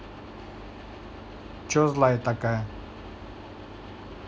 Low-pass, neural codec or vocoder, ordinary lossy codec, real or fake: none; none; none; real